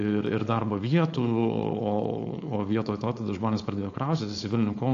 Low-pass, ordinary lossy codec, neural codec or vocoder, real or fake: 7.2 kHz; AAC, 48 kbps; codec, 16 kHz, 4.8 kbps, FACodec; fake